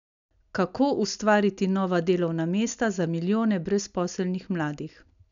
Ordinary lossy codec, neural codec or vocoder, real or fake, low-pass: none; none; real; 7.2 kHz